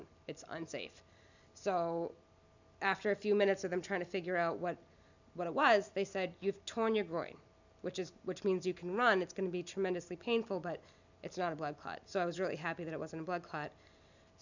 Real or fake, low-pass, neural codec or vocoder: real; 7.2 kHz; none